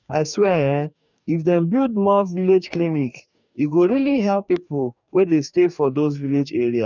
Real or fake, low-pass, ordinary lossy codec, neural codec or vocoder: fake; 7.2 kHz; none; codec, 44.1 kHz, 2.6 kbps, DAC